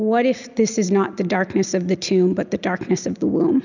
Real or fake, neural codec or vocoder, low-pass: real; none; 7.2 kHz